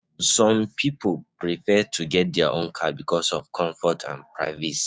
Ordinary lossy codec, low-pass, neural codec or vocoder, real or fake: none; none; codec, 16 kHz, 6 kbps, DAC; fake